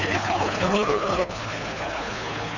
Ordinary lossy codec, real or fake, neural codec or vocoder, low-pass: none; fake; codec, 24 kHz, 3 kbps, HILCodec; 7.2 kHz